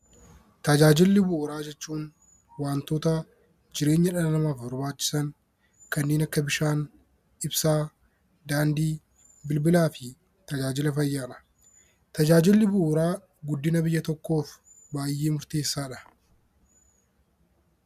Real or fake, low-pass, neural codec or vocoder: real; 14.4 kHz; none